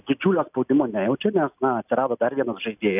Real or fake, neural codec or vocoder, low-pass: real; none; 3.6 kHz